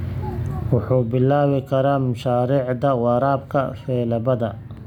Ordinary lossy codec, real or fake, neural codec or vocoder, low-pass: none; real; none; 19.8 kHz